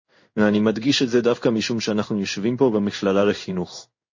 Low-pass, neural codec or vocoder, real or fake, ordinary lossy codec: 7.2 kHz; codec, 16 kHz in and 24 kHz out, 1 kbps, XY-Tokenizer; fake; MP3, 32 kbps